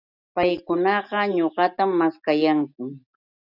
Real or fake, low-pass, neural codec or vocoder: real; 5.4 kHz; none